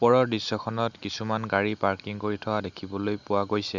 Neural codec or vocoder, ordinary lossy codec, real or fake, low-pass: none; none; real; 7.2 kHz